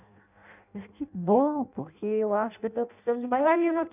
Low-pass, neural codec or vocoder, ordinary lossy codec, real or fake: 3.6 kHz; codec, 16 kHz in and 24 kHz out, 0.6 kbps, FireRedTTS-2 codec; none; fake